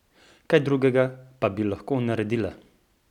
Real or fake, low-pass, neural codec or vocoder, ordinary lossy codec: real; 19.8 kHz; none; none